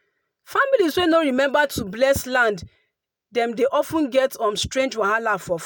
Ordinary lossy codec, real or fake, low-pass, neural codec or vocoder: none; real; none; none